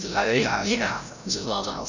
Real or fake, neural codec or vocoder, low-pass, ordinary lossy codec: fake; codec, 16 kHz, 0.5 kbps, FreqCodec, larger model; 7.2 kHz; none